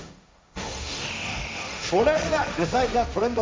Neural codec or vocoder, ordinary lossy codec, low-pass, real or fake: codec, 16 kHz, 1.1 kbps, Voila-Tokenizer; none; none; fake